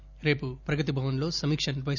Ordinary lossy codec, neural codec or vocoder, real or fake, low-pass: none; none; real; 7.2 kHz